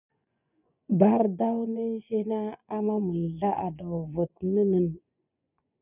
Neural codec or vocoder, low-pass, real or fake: vocoder, 24 kHz, 100 mel bands, Vocos; 3.6 kHz; fake